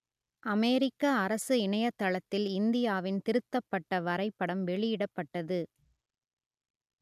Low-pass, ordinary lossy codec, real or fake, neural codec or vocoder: 14.4 kHz; none; real; none